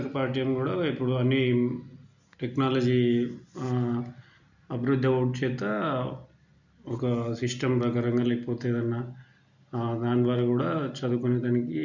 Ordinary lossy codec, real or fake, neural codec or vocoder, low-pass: none; real; none; 7.2 kHz